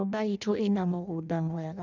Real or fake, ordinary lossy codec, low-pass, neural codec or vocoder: fake; none; 7.2 kHz; codec, 16 kHz in and 24 kHz out, 0.6 kbps, FireRedTTS-2 codec